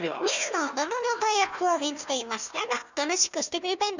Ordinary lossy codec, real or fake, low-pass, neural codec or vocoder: none; fake; 7.2 kHz; codec, 16 kHz, 1 kbps, FunCodec, trained on Chinese and English, 50 frames a second